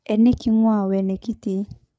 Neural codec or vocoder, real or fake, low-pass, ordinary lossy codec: codec, 16 kHz, 8 kbps, FreqCodec, larger model; fake; none; none